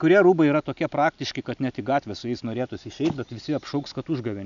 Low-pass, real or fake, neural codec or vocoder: 7.2 kHz; real; none